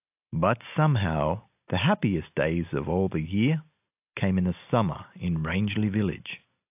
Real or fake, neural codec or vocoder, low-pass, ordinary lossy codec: real; none; 3.6 kHz; AAC, 32 kbps